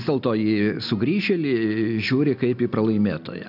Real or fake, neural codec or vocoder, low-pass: real; none; 5.4 kHz